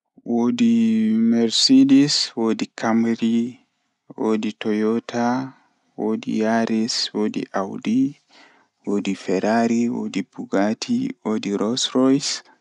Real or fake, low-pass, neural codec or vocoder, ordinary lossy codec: real; 10.8 kHz; none; none